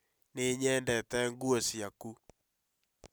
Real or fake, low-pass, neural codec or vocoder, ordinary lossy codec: real; none; none; none